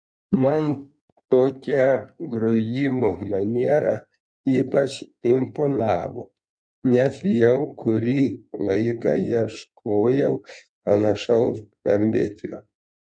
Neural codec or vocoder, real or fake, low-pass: codec, 16 kHz in and 24 kHz out, 1.1 kbps, FireRedTTS-2 codec; fake; 9.9 kHz